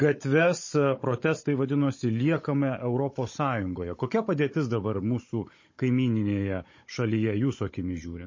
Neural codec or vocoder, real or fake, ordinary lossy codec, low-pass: codec, 16 kHz, 16 kbps, FunCodec, trained on Chinese and English, 50 frames a second; fake; MP3, 32 kbps; 7.2 kHz